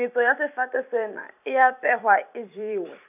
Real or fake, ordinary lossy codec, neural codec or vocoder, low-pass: fake; none; vocoder, 44.1 kHz, 128 mel bands every 256 samples, BigVGAN v2; 3.6 kHz